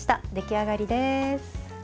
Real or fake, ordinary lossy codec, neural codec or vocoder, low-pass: real; none; none; none